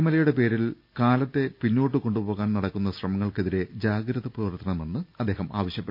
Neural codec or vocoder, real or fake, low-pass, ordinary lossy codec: none; real; 5.4 kHz; none